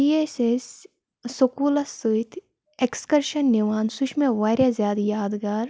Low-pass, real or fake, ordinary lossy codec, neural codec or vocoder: none; real; none; none